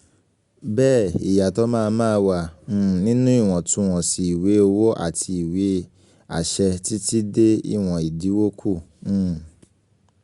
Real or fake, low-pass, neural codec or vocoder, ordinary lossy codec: real; 10.8 kHz; none; Opus, 64 kbps